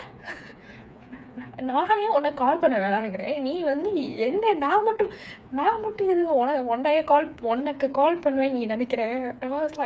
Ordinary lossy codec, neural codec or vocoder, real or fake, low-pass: none; codec, 16 kHz, 4 kbps, FreqCodec, smaller model; fake; none